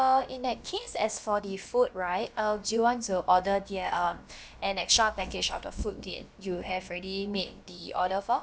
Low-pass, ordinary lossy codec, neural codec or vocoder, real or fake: none; none; codec, 16 kHz, about 1 kbps, DyCAST, with the encoder's durations; fake